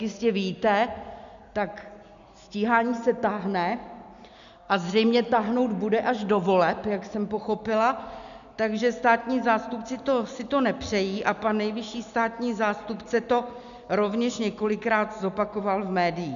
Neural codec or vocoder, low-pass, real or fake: none; 7.2 kHz; real